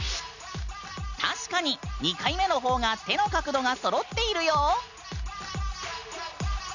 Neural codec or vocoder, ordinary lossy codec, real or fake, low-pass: none; none; real; 7.2 kHz